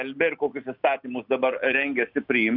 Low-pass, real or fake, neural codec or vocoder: 5.4 kHz; real; none